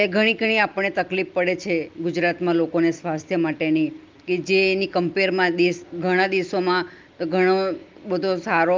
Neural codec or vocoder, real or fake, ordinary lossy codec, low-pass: none; real; Opus, 24 kbps; 7.2 kHz